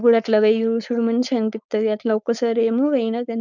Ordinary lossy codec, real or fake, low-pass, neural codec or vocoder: none; fake; 7.2 kHz; codec, 16 kHz, 4.8 kbps, FACodec